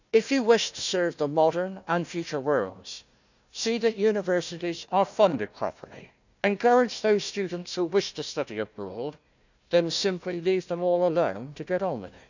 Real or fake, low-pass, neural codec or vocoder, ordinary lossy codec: fake; 7.2 kHz; codec, 16 kHz, 1 kbps, FunCodec, trained on Chinese and English, 50 frames a second; none